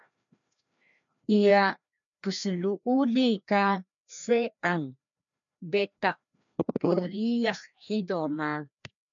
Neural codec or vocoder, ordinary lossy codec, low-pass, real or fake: codec, 16 kHz, 1 kbps, FreqCodec, larger model; MP3, 64 kbps; 7.2 kHz; fake